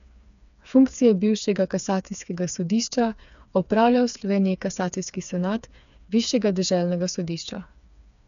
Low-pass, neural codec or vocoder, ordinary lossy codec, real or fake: 7.2 kHz; codec, 16 kHz, 4 kbps, FreqCodec, smaller model; none; fake